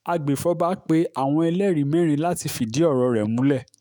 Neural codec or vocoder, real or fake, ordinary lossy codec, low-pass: autoencoder, 48 kHz, 128 numbers a frame, DAC-VAE, trained on Japanese speech; fake; none; none